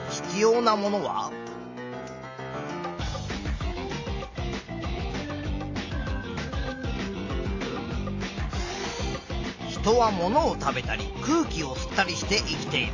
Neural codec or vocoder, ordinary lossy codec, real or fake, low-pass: none; AAC, 48 kbps; real; 7.2 kHz